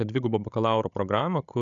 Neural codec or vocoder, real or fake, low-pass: codec, 16 kHz, 16 kbps, FreqCodec, larger model; fake; 7.2 kHz